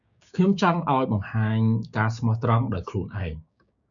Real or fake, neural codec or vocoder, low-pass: fake; codec, 16 kHz, 6 kbps, DAC; 7.2 kHz